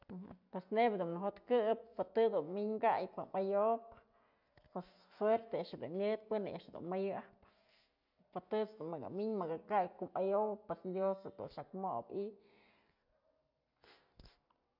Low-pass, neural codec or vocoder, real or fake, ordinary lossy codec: 5.4 kHz; none; real; none